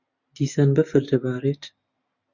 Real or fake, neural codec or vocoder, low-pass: real; none; 7.2 kHz